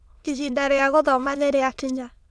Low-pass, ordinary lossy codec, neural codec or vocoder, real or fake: none; none; autoencoder, 22.05 kHz, a latent of 192 numbers a frame, VITS, trained on many speakers; fake